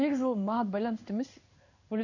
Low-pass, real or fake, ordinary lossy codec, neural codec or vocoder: 7.2 kHz; fake; none; codec, 16 kHz in and 24 kHz out, 1 kbps, XY-Tokenizer